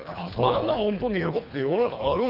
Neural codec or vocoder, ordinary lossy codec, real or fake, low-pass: codec, 24 kHz, 3 kbps, HILCodec; none; fake; 5.4 kHz